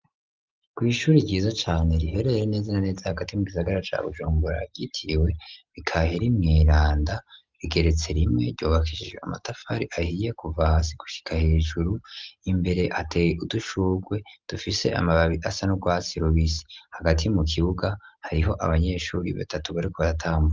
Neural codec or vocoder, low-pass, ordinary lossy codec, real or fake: none; 7.2 kHz; Opus, 32 kbps; real